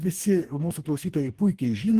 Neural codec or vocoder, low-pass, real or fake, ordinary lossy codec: codec, 44.1 kHz, 2.6 kbps, DAC; 14.4 kHz; fake; Opus, 24 kbps